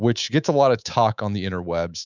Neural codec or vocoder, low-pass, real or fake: codec, 24 kHz, 3.1 kbps, DualCodec; 7.2 kHz; fake